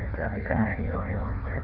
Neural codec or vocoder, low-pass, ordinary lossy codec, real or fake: codec, 16 kHz, 1 kbps, FunCodec, trained on Chinese and English, 50 frames a second; 5.4 kHz; none; fake